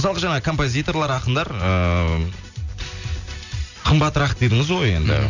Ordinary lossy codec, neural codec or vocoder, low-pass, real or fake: none; none; 7.2 kHz; real